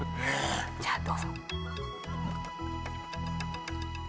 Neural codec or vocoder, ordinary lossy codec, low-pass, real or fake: none; none; none; real